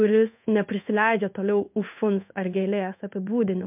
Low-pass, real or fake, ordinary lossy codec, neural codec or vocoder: 3.6 kHz; fake; MP3, 32 kbps; codec, 16 kHz in and 24 kHz out, 1 kbps, XY-Tokenizer